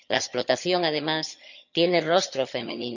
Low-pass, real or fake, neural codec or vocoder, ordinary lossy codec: 7.2 kHz; fake; vocoder, 22.05 kHz, 80 mel bands, HiFi-GAN; none